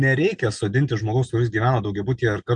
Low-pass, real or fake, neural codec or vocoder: 9.9 kHz; real; none